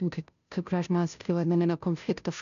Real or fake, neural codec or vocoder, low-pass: fake; codec, 16 kHz, 0.5 kbps, FunCodec, trained on Chinese and English, 25 frames a second; 7.2 kHz